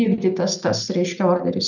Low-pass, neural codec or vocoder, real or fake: 7.2 kHz; none; real